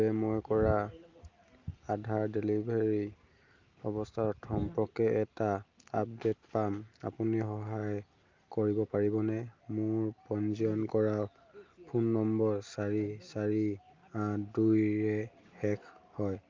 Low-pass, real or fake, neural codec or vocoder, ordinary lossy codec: 7.2 kHz; real; none; Opus, 24 kbps